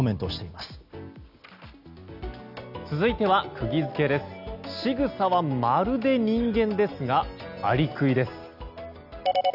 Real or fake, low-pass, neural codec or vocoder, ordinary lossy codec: real; 5.4 kHz; none; none